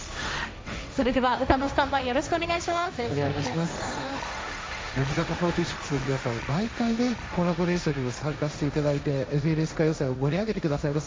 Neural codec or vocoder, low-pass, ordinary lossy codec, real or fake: codec, 16 kHz, 1.1 kbps, Voila-Tokenizer; none; none; fake